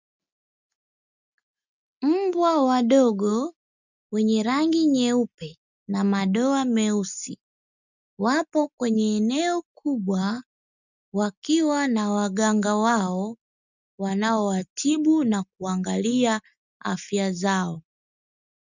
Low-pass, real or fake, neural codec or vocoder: 7.2 kHz; real; none